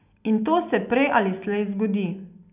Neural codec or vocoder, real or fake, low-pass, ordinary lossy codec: none; real; 3.6 kHz; none